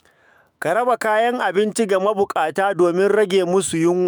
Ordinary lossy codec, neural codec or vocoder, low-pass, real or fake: none; autoencoder, 48 kHz, 128 numbers a frame, DAC-VAE, trained on Japanese speech; none; fake